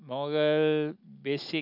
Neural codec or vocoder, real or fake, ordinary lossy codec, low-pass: none; real; none; 5.4 kHz